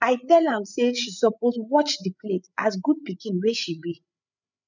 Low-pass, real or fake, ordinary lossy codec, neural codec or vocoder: 7.2 kHz; fake; none; codec, 16 kHz, 8 kbps, FreqCodec, larger model